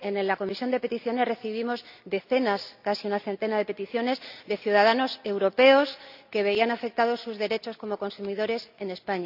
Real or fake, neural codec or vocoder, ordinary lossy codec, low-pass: real; none; none; 5.4 kHz